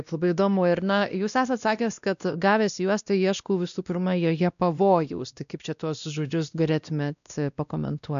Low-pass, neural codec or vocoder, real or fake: 7.2 kHz; codec, 16 kHz, 1 kbps, X-Codec, WavLM features, trained on Multilingual LibriSpeech; fake